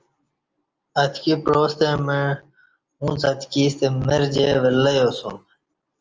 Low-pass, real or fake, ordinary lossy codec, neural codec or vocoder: 7.2 kHz; real; Opus, 24 kbps; none